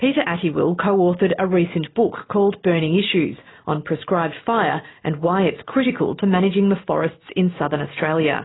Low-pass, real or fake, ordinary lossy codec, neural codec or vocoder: 7.2 kHz; real; AAC, 16 kbps; none